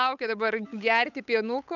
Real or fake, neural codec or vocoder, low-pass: fake; codec, 16 kHz, 8 kbps, FunCodec, trained on Chinese and English, 25 frames a second; 7.2 kHz